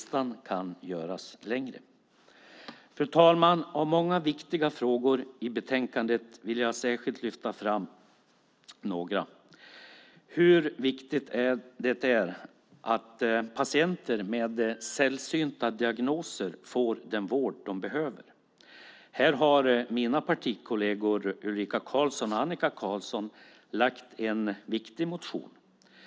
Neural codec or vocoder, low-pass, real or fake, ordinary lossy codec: none; none; real; none